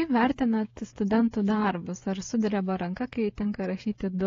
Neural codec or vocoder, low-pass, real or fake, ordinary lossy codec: codec, 16 kHz, 16 kbps, FreqCodec, smaller model; 7.2 kHz; fake; AAC, 32 kbps